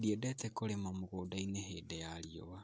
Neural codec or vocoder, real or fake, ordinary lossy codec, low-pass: none; real; none; none